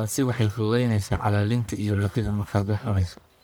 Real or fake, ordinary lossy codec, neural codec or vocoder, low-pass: fake; none; codec, 44.1 kHz, 1.7 kbps, Pupu-Codec; none